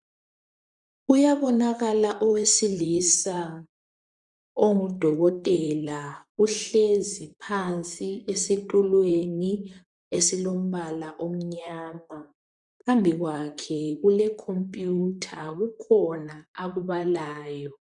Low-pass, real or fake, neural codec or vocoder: 10.8 kHz; fake; vocoder, 44.1 kHz, 128 mel bands, Pupu-Vocoder